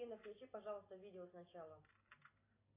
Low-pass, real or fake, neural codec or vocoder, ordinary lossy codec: 3.6 kHz; real; none; AAC, 16 kbps